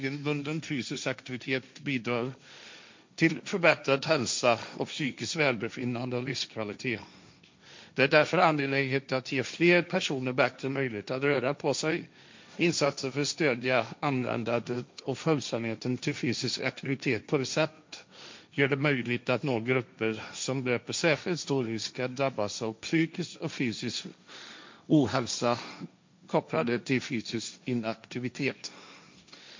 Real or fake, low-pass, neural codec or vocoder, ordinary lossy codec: fake; 7.2 kHz; codec, 16 kHz, 1.1 kbps, Voila-Tokenizer; MP3, 48 kbps